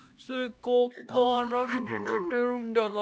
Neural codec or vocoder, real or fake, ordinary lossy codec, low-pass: codec, 16 kHz, 1 kbps, X-Codec, HuBERT features, trained on LibriSpeech; fake; none; none